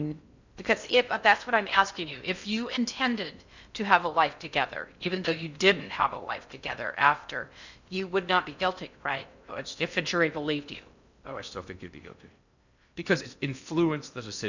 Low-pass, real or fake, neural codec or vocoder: 7.2 kHz; fake; codec, 16 kHz in and 24 kHz out, 0.6 kbps, FocalCodec, streaming, 2048 codes